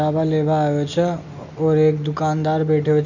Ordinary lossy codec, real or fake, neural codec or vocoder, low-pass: none; real; none; 7.2 kHz